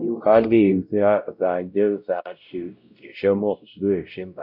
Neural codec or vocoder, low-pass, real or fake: codec, 16 kHz, 0.5 kbps, X-Codec, WavLM features, trained on Multilingual LibriSpeech; 5.4 kHz; fake